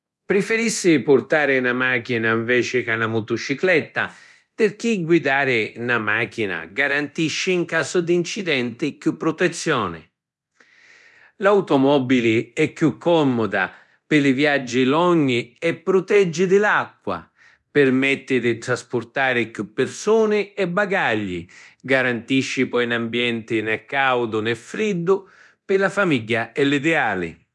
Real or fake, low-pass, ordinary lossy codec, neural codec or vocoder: fake; none; none; codec, 24 kHz, 0.9 kbps, DualCodec